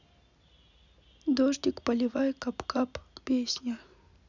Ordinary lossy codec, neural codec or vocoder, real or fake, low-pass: none; none; real; 7.2 kHz